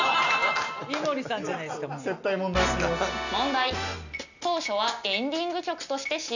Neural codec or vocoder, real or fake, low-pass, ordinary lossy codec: none; real; 7.2 kHz; none